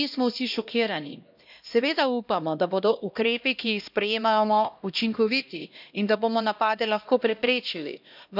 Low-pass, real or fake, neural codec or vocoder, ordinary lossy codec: 5.4 kHz; fake; codec, 16 kHz, 1 kbps, X-Codec, HuBERT features, trained on LibriSpeech; none